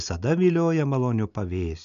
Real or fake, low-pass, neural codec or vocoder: real; 7.2 kHz; none